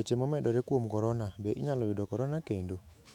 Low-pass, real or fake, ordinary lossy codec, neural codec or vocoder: 19.8 kHz; fake; none; autoencoder, 48 kHz, 128 numbers a frame, DAC-VAE, trained on Japanese speech